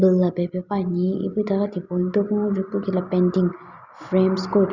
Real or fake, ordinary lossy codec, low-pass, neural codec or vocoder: real; Opus, 64 kbps; 7.2 kHz; none